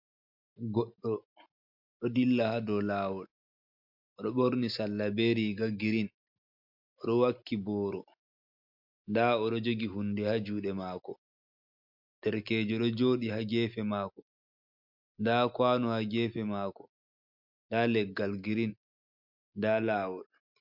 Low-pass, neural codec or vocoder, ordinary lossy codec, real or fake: 5.4 kHz; none; MP3, 48 kbps; real